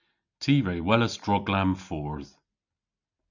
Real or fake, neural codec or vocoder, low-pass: real; none; 7.2 kHz